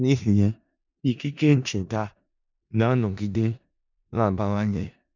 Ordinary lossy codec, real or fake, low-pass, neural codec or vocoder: none; fake; 7.2 kHz; codec, 16 kHz in and 24 kHz out, 0.4 kbps, LongCat-Audio-Codec, four codebook decoder